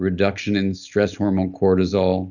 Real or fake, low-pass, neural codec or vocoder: real; 7.2 kHz; none